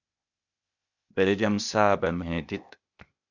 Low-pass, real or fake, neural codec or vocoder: 7.2 kHz; fake; codec, 16 kHz, 0.8 kbps, ZipCodec